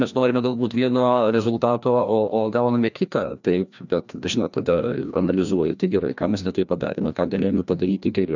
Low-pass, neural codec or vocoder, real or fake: 7.2 kHz; codec, 16 kHz, 1 kbps, FreqCodec, larger model; fake